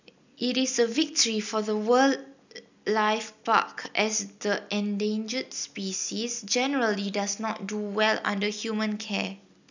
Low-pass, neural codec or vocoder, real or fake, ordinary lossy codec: 7.2 kHz; none; real; none